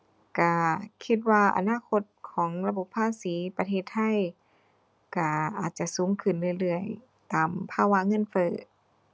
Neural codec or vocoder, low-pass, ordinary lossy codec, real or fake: none; none; none; real